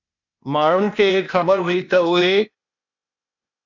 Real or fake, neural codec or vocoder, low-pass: fake; codec, 16 kHz, 0.8 kbps, ZipCodec; 7.2 kHz